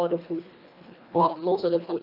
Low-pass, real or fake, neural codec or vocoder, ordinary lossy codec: 5.4 kHz; fake; codec, 24 kHz, 1.5 kbps, HILCodec; none